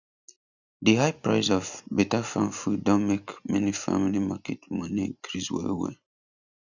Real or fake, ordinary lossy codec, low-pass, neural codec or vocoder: real; none; 7.2 kHz; none